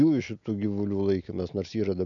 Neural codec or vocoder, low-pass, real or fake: none; 7.2 kHz; real